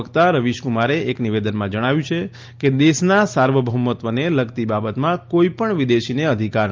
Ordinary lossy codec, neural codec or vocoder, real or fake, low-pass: Opus, 24 kbps; none; real; 7.2 kHz